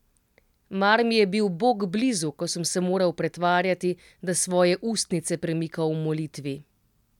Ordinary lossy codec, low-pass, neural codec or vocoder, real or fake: none; 19.8 kHz; none; real